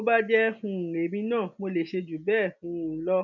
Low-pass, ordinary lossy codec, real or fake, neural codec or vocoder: 7.2 kHz; none; real; none